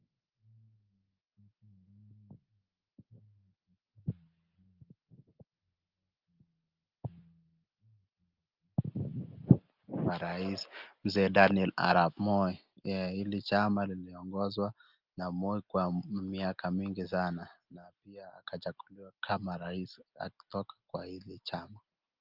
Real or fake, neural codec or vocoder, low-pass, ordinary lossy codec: real; none; 5.4 kHz; Opus, 24 kbps